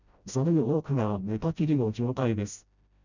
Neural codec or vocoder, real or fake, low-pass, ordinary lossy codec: codec, 16 kHz, 0.5 kbps, FreqCodec, smaller model; fake; 7.2 kHz; none